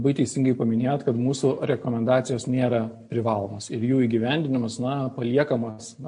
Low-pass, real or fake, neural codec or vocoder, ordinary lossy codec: 9.9 kHz; real; none; MP3, 48 kbps